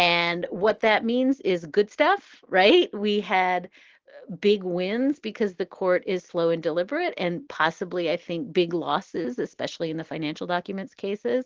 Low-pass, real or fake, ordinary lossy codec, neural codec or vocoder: 7.2 kHz; real; Opus, 16 kbps; none